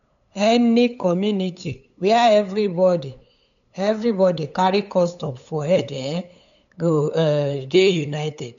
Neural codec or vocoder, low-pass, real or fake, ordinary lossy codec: codec, 16 kHz, 8 kbps, FunCodec, trained on LibriTTS, 25 frames a second; 7.2 kHz; fake; none